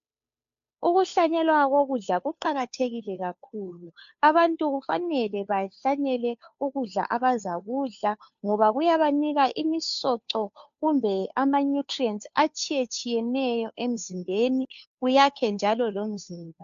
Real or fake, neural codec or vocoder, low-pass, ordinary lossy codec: fake; codec, 16 kHz, 2 kbps, FunCodec, trained on Chinese and English, 25 frames a second; 7.2 kHz; AAC, 64 kbps